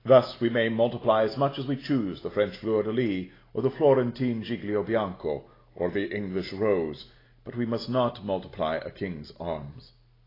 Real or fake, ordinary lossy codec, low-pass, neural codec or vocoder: real; AAC, 24 kbps; 5.4 kHz; none